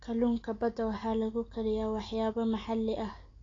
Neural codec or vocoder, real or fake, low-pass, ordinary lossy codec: none; real; 7.2 kHz; AAC, 32 kbps